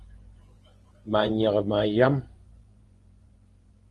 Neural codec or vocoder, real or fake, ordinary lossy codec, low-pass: vocoder, 24 kHz, 100 mel bands, Vocos; fake; Opus, 24 kbps; 10.8 kHz